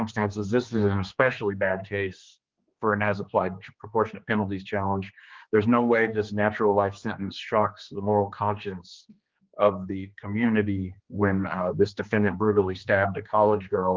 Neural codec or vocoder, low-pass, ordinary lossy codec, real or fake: codec, 16 kHz, 2 kbps, X-Codec, HuBERT features, trained on general audio; 7.2 kHz; Opus, 32 kbps; fake